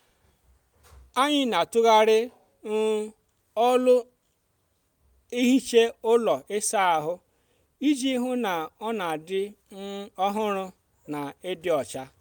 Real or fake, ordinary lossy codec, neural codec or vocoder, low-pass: real; none; none; none